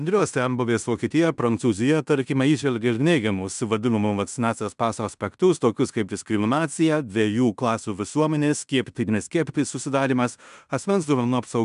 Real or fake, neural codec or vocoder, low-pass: fake; codec, 16 kHz in and 24 kHz out, 0.9 kbps, LongCat-Audio-Codec, fine tuned four codebook decoder; 10.8 kHz